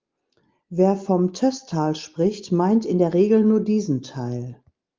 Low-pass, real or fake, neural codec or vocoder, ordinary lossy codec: 7.2 kHz; real; none; Opus, 32 kbps